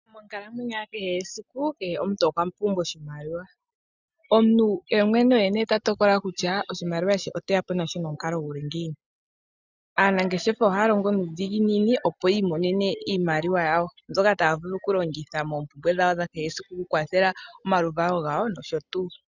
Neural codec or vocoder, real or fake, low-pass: none; real; 7.2 kHz